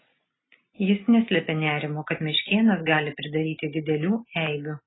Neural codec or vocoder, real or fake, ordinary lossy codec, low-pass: none; real; AAC, 16 kbps; 7.2 kHz